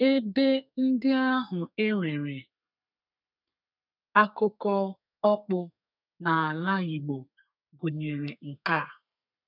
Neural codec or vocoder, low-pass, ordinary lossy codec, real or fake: codec, 32 kHz, 1.9 kbps, SNAC; 5.4 kHz; none; fake